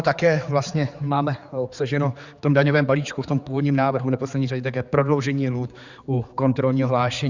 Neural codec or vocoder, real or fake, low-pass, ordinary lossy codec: codec, 16 kHz, 4 kbps, X-Codec, HuBERT features, trained on general audio; fake; 7.2 kHz; Opus, 64 kbps